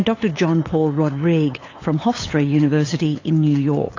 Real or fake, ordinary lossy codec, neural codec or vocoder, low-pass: fake; AAC, 32 kbps; codec, 16 kHz, 8 kbps, FunCodec, trained on LibriTTS, 25 frames a second; 7.2 kHz